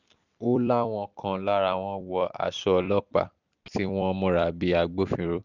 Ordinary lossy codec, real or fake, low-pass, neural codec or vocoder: none; fake; 7.2 kHz; vocoder, 44.1 kHz, 128 mel bands every 256 samples, BigVGAN v2